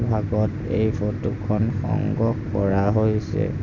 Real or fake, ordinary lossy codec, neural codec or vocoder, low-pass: real; none; none; 7.2 kHz